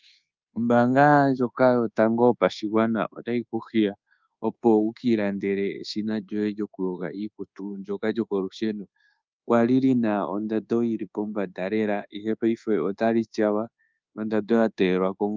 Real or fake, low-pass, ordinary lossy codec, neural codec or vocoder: fake; 7.2 kHz; Opus, 24 kbps; codec, 24 kHz, 1.2 kbps, DualCodec